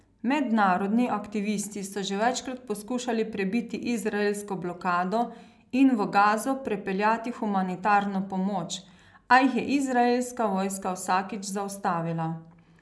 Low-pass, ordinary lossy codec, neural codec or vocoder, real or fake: none; none; none; real